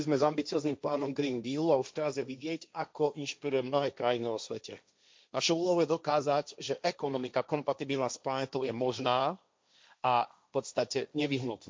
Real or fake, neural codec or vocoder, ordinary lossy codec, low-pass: fake; codec, 16 kHz, 1.1 kbps, Voila-Tokenizer; none; none